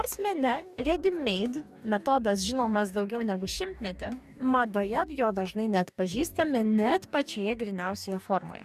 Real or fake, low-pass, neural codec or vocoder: fake; 14.4 kHz; codec, 44.1 kHz, 2.6 kbps, DAC